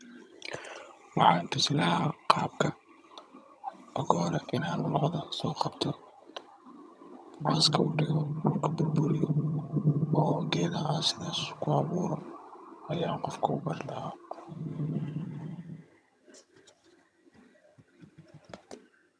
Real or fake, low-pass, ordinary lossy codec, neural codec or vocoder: fake; none; none; vocoder, 22.05 kHz, 80 mel bands, HiFi-GAN